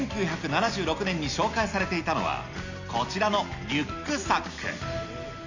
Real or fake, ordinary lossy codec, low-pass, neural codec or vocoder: real; Opus, 64 kbps; 7.2 kHz; none